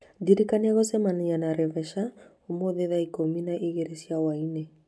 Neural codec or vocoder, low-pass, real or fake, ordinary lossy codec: none; none; real; none